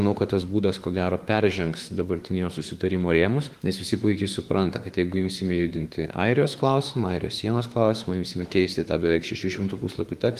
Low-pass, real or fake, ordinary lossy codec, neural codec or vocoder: 14.4 kHz; fake; Opus, 24 kbps; autoencoder, 48 kHz, 32 numbers a frame, DAC-VAE, trained on Japanese speech